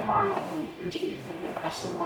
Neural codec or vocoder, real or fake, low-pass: codec, 44.1 kHz, 0.9 kbps, DAC; fake; 19.8 kHz